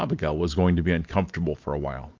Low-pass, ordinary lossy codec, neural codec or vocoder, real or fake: 7.2 kHz; Opus, 24 kbps; none; real